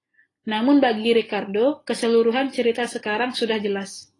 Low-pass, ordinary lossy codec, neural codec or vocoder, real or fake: 10.8 kHz; AAC, 32 kbps; none; real